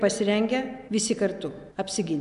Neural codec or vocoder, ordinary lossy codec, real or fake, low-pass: none; AAC, 96 kbps; real; 10.8 kHz